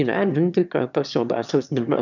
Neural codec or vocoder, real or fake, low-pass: autoencoder, 22.05 kHz, a latent of 192 numbers a frame, VITS, trained on one speaker; fake; 7.2 kHz